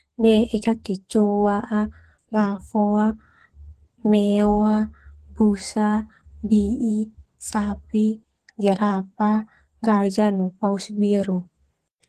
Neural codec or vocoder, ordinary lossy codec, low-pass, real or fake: codec, 32 kHz, 1.9 kbps, SNAC; Opus, 16 kbps; 14.4 kHz; fake